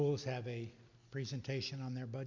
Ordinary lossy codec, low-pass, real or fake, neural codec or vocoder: MP3, 64 kbps; 7.2 kHz; real; none